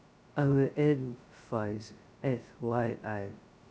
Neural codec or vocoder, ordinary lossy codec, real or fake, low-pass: codec, 16 kHz, 0.2 kbps, FocalCodec; none; fake; none